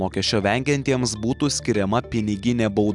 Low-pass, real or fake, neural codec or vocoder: 10.8 kHz; real; none